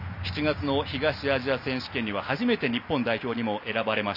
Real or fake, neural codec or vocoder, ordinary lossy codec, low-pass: real; none; none; 5.4 kHz